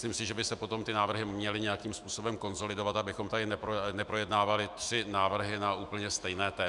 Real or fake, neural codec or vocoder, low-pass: fake; autoencoder, 48 kHz, 128 numbers a frame, DAC-VAE, trained on Japanese speech; 10.8 kHz